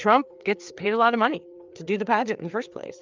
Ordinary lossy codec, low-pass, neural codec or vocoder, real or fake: Opus, 24 kbps; 7.2 kHz; codec, 16 kHz, 4 kbps, FreqCodec, larger model; fake